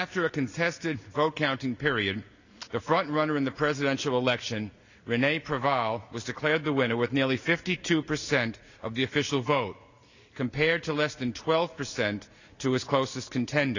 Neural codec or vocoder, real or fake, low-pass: none; real; 7.2 kHz